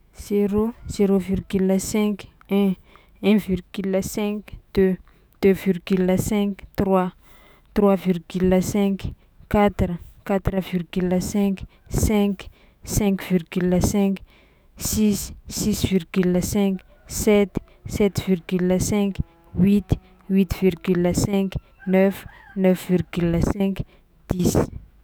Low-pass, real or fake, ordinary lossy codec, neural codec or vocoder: none; fake; none; autoencoder, 48 kHz, 128 numbers a frame, DAC-VAE, trained on Japanese speech